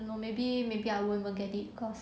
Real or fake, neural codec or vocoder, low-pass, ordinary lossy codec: real; none; none; none